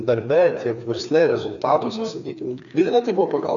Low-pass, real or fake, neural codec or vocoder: 7.2 kHz; fake; codec, 16 kHz, 2 kbps, FreqCodec, larger model